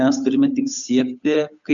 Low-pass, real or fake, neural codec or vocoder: 7.2 kHz; fake; codec, 16 kHz, 8 kbps, FunCodec, trained on Chinese and English, 25 frames a second